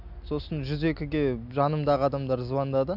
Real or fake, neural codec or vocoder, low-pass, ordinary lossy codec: real; none; 5.4 kHz; none